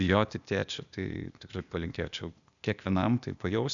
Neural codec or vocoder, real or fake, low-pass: codec, 16 kHz, 0.8 kbps, ZipCodec; fake; 7.2 kHz